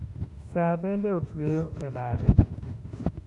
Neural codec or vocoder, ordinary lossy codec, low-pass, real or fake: autoencoder, 48 kHz, 32 numbers a frame, DAC-VAE, trained on Japanese speech; none; 10.8 kHz; fake